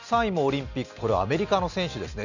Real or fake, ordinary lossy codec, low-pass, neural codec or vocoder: real; none; 7.2 kHz; none